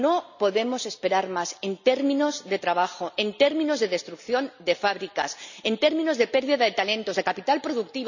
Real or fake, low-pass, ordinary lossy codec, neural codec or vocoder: real; 7.2 kHz; none; none